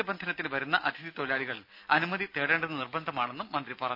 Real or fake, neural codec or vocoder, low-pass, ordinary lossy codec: real; none; 5.4 kHz; none